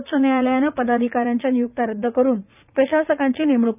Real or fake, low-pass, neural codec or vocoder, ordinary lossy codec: fake; 3.6 kHz; vocoder, 44.1 kHz, 80 mel bands, Vocos; none